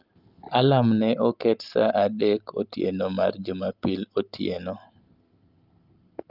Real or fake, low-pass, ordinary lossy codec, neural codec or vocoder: real; 5.4 kHz; Opus, 32 kbps; none